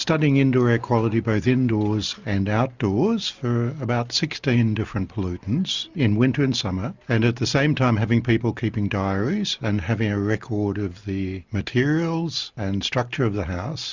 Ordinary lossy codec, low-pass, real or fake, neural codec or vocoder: Opus, 64 kbps; 7.2 kHz; real; none